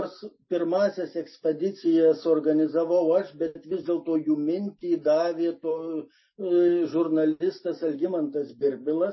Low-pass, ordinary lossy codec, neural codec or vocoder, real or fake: 7.2 kHz; MP3, 24 kbps; none; real